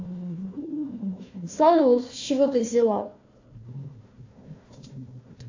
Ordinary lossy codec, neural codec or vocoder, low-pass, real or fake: AAC, 48 kbps; codec, 16 kHz, 1 kbps, FunCodec, trained on Chinese and English, 50 frames a second; 7.2 kHz; fake